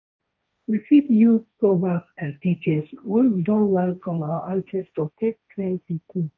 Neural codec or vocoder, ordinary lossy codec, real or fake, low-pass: codec, 16 kHz, 1.1 kbps, Voila-Tokenizer; none; fake; none